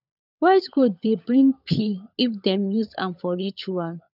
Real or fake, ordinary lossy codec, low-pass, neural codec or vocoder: fake; none; 5.4 kHz; codec, 16 kHz, 16 kbps, FunCodec, trained on LibriTTS, 50 frames a second